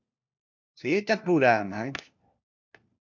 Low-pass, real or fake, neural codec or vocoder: 7.2 kHz; fake; codec, 16 kHz, 1 kbps, FunCodec, trained on LibriTTS, 50 frames a second